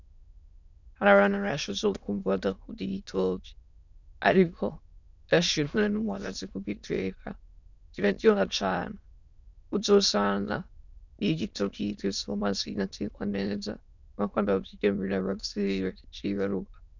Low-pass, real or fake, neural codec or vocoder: 7.2 kHz; fake; autoencoder, 22.05 kHz, a latent of 192 numbers a frame, VITS, trained on many speakers